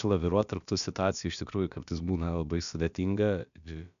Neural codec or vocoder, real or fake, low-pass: codec, 16 kHz, about 1 kbps, DyCAST, with the encoder's durations; fake; 7.2 kHz